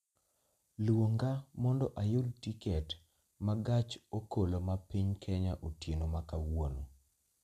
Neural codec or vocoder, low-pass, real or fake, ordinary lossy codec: none; 14.4 kHz; real; none